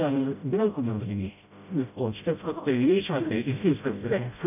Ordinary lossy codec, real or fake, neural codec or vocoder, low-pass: none; fake; codec, 16 kHz, 0.5 kbps, FreqCodec, smaller model; 3.6 kHz